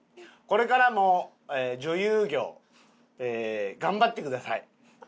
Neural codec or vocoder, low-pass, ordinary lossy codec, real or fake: none; none; none; real